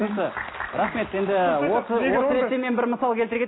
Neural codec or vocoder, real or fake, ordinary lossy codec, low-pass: none; real; AAC, 16 kbps; 7.2 kHz